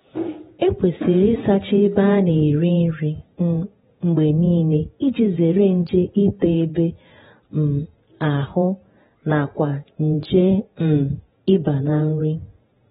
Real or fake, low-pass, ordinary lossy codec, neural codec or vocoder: fake; 19.8 kHz; AAC, 16 kbps; vocoder, 48 kHz, 128 mel bands, Vocos